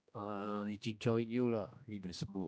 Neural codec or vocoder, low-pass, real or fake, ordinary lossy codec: codec, 16 kHz, 1 kbps, X-Codec, HuBERT features, trained on general audio; none; fake; none